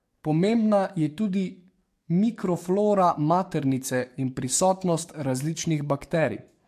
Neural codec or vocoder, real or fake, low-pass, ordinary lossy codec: codec, 44.1 kHz, 7.8 kbps, DAC; fake; 14.4 kHz; MP3, 64 kbps